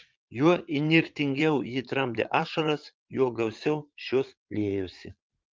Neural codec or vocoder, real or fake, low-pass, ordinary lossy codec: vocoder, 22.05 kHz, 80 mel bands, Vocos; fake; 7.2 kHz; Opus, 32 kbps